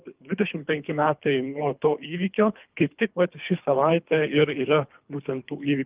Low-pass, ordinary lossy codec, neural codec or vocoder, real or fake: 3.6 kHz; Opus, 32 kbps; codec, 24 kHz, 3 kbps, HILCodec; fake